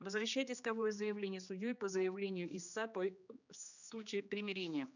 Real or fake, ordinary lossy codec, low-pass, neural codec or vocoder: fake; none; 7.2 kHz; codec, 16 kHz, 2 kbps, X-Codec, HuBERT features, trained on general audio